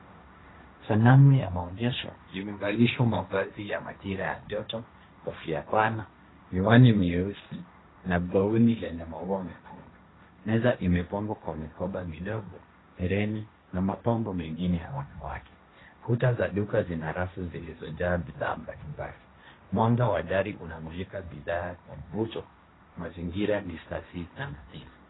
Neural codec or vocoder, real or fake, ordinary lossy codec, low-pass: codec, 16 kHz, 1.1 kbps, Voila-Tokenizer; fake; AAC, 16 kbps; 7.2 kHz